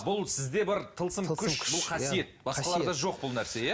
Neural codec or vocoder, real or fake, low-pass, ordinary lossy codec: none; real; none; none